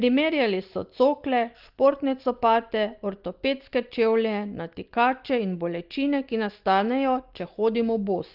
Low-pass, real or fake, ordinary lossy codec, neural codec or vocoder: 5.4 kHz; real; Opus, 32 kbps; none